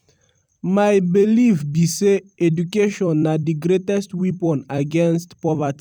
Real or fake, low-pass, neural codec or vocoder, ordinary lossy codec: fake; 19.8 kHz; vocoder, 44.1 kHz, 128 mel bands every 256 samples, BigVGAN v2; none